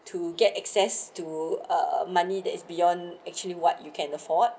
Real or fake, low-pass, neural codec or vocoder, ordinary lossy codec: real; none; none; none